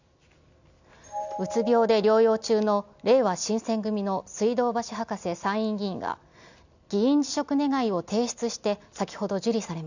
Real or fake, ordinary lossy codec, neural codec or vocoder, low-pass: real; none; none; 7.2 kHz